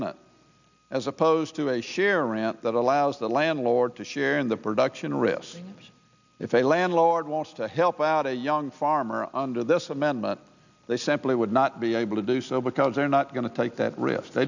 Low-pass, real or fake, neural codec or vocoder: 7.2 kHz; real; none